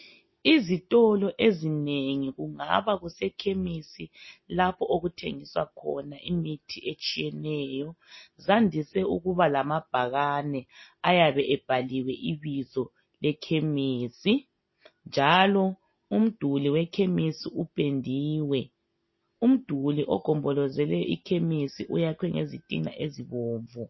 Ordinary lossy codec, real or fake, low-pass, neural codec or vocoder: MP3, 24 kbps; real; 7.2 kHz; none